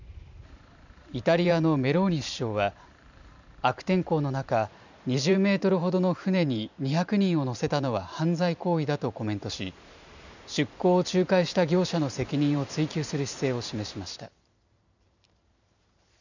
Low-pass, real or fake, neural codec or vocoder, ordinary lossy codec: 7.2 kHz; fake; vocoder, 44.1 kHz, 128 mel bands every 512 samples, BigVGAN v2; none